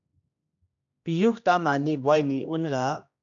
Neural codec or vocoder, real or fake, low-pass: codec, 16 kHz, 1 kbps, X-Codec, HuBERT features, trained on general audio; fake; 7.2 kHz